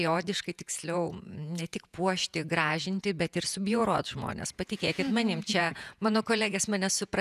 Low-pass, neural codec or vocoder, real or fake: 14.4 kHz; vocoder, 48 kHz, 128 mel bands, Vocos; fake